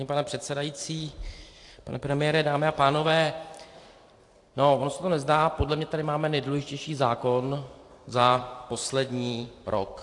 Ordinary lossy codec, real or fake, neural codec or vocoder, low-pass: AAC, 48 kbps; fake; vocoder, 44.1 kHz, 128 mel bands every 512 samples, BigVGAN v2; 10.8 kHz